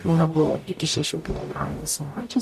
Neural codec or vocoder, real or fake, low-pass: codec, 44.1 kHz, 0.9 kbps, DAC; fake; 14.4 kHz